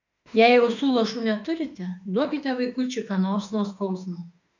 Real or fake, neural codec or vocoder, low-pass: fake; autoencoder, 48 kHz, 32 numbers a frame, DAC-VAE, trained on Japanese speech; 7.2 kHz